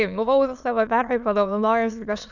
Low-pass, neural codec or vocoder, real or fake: 7.2 kHz; autoencoder, 22.05 kHz, a latent of 192 numbers a frame, VITS, trained on many speakers; fake